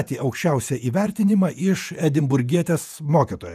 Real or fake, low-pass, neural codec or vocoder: fake; 14.4 kHz; vocoder, 48 kHz, 128 mel bands, Vocos